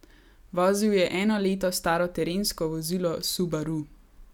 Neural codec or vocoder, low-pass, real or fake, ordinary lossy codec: none; 19.8 kHz; real; none